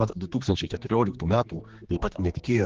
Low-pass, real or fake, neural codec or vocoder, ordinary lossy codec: 7.2 kHz; fake; codec, 16 kHz, 2 kbps, X-Codec, HuBERT features, trained on general audio; Opus, 16 kbps